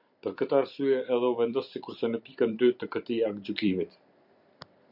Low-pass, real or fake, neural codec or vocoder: 5.4 kHz; fake; vocoder, 44.1 kHz, 128 mel bands every 256 samples, BigVGAN v2